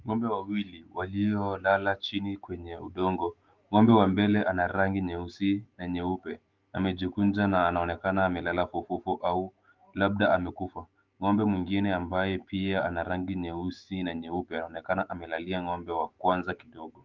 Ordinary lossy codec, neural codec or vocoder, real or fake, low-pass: Opus, 24 kbps; autoencoder, 48 kHz, 128 numbers a frame, DAC-VAE, trained on Japanese speech; fake; 7.2 kHz